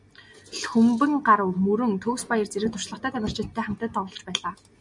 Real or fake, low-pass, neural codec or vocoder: real; 10.8 kHz; none